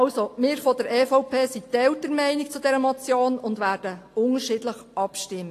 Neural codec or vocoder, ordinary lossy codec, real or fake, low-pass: none; AAC, 48 kbps; real; 14.4 kHz